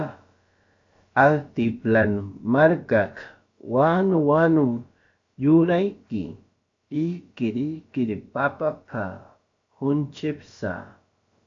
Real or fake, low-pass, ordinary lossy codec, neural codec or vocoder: fake; 7.2 kHz; AAC, 48 kbps; codec, 16 kHz, about 1 kbps, DyCAST, with the encoder's durations